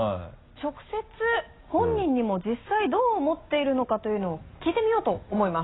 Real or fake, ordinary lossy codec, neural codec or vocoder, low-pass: real; AAC, 16 kbps; none; 7.2 kHz